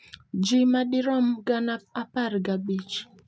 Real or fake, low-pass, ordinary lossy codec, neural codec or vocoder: real; none; none; none